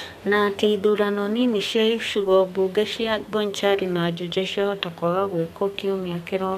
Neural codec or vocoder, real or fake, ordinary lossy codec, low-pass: codec, 32 kHz, 1.9 kbps, SNAC; fake; none; 14.4 kHz